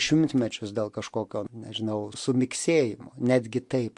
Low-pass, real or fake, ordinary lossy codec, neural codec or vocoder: 10.8 kHz; real; MP3, 64 kbps; none